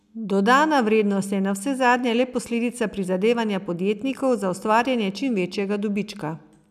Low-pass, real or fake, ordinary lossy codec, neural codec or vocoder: 14.4 kHz; real; none; none